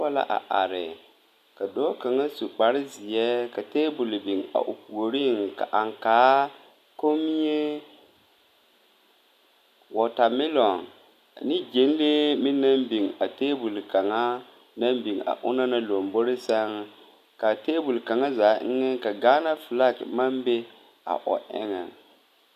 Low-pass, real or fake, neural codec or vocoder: 14.4 kHz; real; none